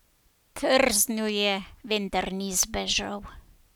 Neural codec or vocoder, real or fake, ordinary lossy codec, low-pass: none; real; none; none